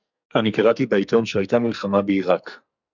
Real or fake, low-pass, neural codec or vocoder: fake; 7.2 kHz; codec, 44.1 kHz, 2.6 kbps, SNAC